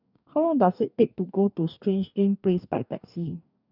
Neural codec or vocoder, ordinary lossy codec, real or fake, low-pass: codec, 44.1 kHz, 2.6 kbps, DAC; none; fake; 5.4 kHz